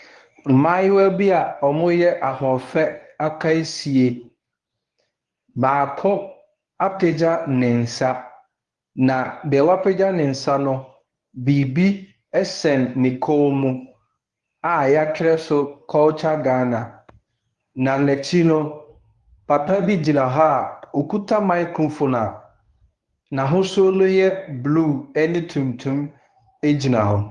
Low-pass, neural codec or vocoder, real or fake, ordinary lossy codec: 10.8 kHz; codec, 24 kHz, 0.9 kbps, WavTokenizer, medium speech release version 1; fake; Opus, 32 kbps